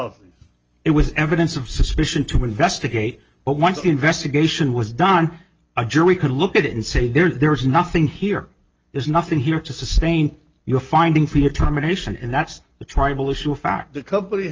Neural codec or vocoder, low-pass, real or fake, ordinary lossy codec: none; 7.2 kHz; real; Opus, 24 kbps